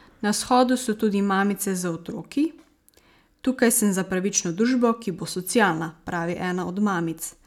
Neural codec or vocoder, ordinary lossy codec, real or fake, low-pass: none; none; real; 19.8 kHz